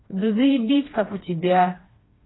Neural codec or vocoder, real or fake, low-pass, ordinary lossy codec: codec, 16 kHz, 2 kbps, FreqCodec, smaller model; fake; 7.2 kHz; AAC, 16 kbps